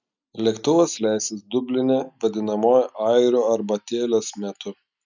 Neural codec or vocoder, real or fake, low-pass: none; real; 7.2 kHz